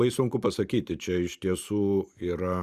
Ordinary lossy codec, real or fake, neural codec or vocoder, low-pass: Opus, 64 kbps; fake; vocoder, 44.1 kHz, 128 mel bands every 512 samples, BigVGAN v2; 14.4 kHz